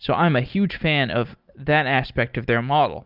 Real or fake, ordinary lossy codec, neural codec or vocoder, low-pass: real; Opus, 24 kbps; none; 5.4 kHz